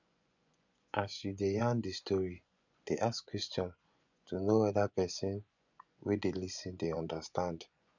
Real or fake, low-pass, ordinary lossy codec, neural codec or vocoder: fake; 7.2 kHz; none; vocoder, 24 kHz, 100 mel bands, Vocos